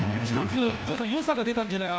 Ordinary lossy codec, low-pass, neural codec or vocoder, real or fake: none; none; codec, 16 kHz, 1 kbps, FunCodec, trained on LibriTTS, 50 frames a second; fake